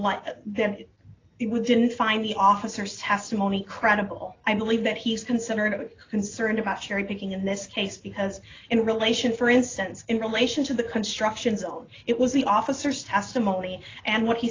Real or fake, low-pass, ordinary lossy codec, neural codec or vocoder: real; 7.2 kHz; AAC, 32 kbps; none